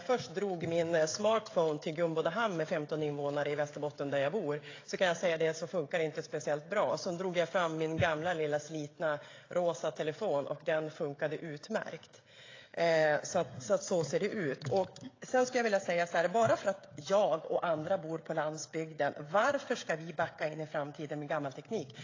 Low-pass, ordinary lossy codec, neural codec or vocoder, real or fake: 7.2 kHz; AAC, 32 kbps; codec, 16 kHz, 16 kbps, FreqCodec, smaller model; fake